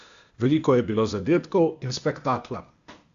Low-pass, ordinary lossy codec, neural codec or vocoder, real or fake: 7.2 kHz; Opus, 64 kbps; codec, 16 kHz, 0.8 kbps, ZipCodec; fake